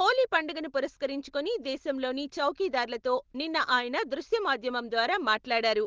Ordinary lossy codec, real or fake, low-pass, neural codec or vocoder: Opus, 16 kbps; real; 7.2 kHz; none